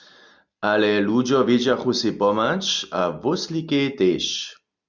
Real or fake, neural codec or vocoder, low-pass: real; none; 7.2 kHz